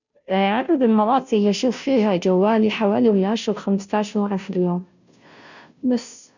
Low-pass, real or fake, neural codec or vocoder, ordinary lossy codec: 7.2 kHz; fake; codec, 16 kHz, 0.5 kbps, FunCodec, trained on Chinese and English, 25 frames a second; none